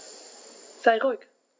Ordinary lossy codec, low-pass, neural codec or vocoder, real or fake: none; none; none; real